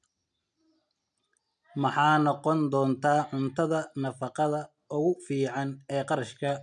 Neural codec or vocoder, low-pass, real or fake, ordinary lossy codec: none; 9.9 kHz; real; none